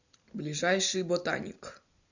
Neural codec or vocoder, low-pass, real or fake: none; 7.2 kHz; real